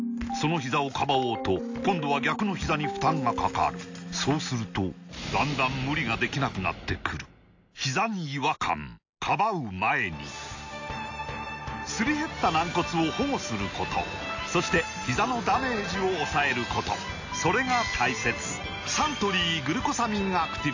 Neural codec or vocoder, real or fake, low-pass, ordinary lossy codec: none; real; 7.2 kHz; AAC, 48 kbps